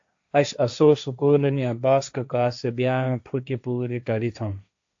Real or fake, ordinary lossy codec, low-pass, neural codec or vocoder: fake; AAC, 64 kbps; 7.2 kHz; codec, 16 kHz, 1.1 kbps, Voila-Tokenizer